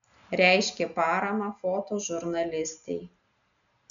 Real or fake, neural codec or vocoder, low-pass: real; none; 7.2 kHz